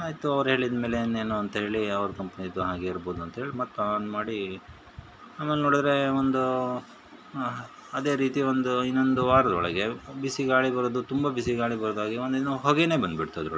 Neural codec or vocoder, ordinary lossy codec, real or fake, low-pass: none; none; real; none